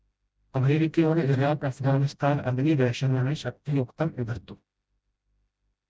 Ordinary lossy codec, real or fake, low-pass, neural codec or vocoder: none; fake; none; codec, 16 kHz, 0.5 kbps, FreqCodec, smaller model